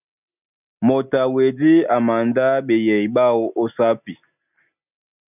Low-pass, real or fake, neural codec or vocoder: 3.6 kHz; real; none